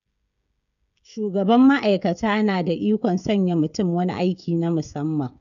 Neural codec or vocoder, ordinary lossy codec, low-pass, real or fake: codec, 16 kHz, 16 kbps, FreqCodec, smaller model; none; 7.2 kHz; fake